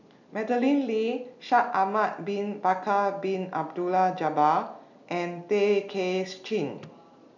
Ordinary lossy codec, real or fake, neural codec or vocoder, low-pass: none; real; none; 7.2 kHz